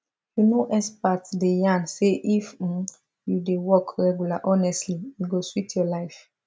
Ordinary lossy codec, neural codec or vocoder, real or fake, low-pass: none; none; real; none